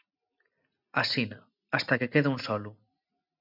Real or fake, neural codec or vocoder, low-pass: real; none; 5.4 kHz